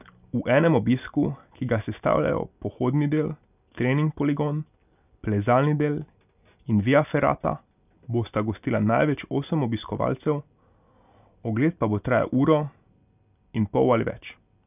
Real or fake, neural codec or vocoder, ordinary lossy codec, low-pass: real; none; none; 3.6 kHz